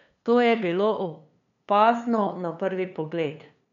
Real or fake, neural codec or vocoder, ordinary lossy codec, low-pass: fake; codec, 16 kHz, 2 kbps, FunCodec, trained on LibriTTS, 25 frames a second; none; 7.2 kHz